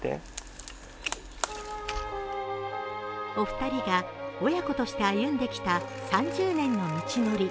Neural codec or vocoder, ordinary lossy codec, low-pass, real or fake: none; none; none; real